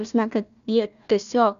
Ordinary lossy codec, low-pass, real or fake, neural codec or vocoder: MP3, 96 kbps; 7.2 kHz; fake; codec, 16 kHz, 1 kbps, FunCodec, trained on Chinese and English, 50 frames a second